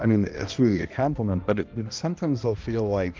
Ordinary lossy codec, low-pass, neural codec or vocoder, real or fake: Opus, 24 kbps; 7.2 kHz; codec, 16 kHz, 1 kbps, X-Codec, HuBERT features, trained on balanced general audio; fake